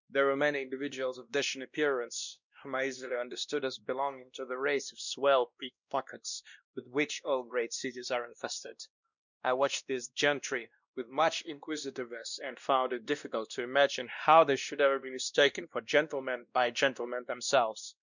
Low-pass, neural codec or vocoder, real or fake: 7.2 kHz; codec, 16 kHz, 1 kbps, X-Codec, WavLM features, trained on Multilingual LibriSpeech; fake